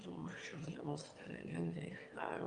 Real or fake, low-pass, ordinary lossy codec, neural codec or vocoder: fake; 9.9 kHz; Opus, 32 kbps; autoencoder, 22.05 kHz, a latent of 192 numbers a frame, VITS, trained on one speaker